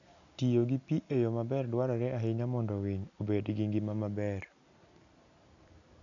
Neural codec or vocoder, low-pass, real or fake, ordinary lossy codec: none; 7.2 kHz; real; none